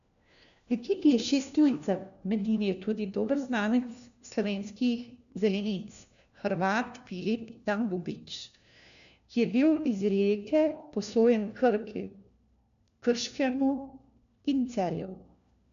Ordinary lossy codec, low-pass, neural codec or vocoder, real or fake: none; 7.2 kHz; codec, 16 kHz, 1 kbps, FunCodec, trained on LibriTTS, 50 frames a second; fake